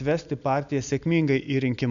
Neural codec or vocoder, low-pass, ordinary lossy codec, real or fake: none; 7.2 kHz; MP3, 96 kbps; real